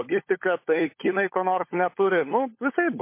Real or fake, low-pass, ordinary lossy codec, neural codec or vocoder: fake; 3.6 kHz; MP3, 24 kbps; codec, 16 kHz, 16 kbps, FunCodec, trained on LibriTTS, 50 frames a second